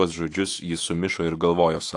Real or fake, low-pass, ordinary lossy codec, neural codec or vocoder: fake; 10.8 kHz; AAC, 48 kbps; autoencoder, 48 kHz, 128 numbers a frame, DAC-VAE, trained on Japanese speech